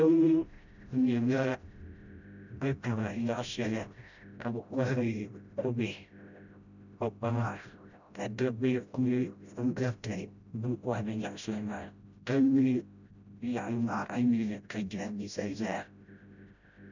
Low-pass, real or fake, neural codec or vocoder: 7.2 kHz; fake; codec, 16 kHz, 0.5 kbps, FreqCodec, smaller model